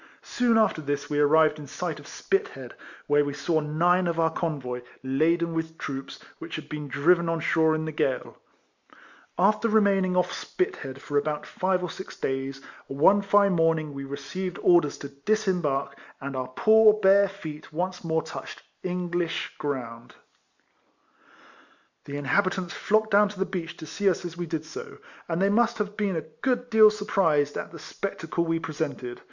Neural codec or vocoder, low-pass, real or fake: none; 7.2 kHz; real